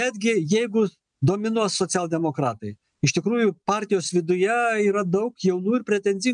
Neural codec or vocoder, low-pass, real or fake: none; 9.9 kHz; real